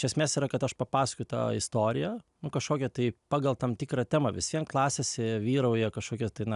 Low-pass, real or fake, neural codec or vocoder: 10.8 kHz; real; none